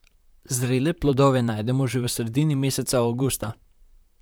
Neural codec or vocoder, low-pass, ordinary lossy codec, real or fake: vocoder, 44.1 kHz, 128 mel bands, Pupu-Vocoder; none; none; fake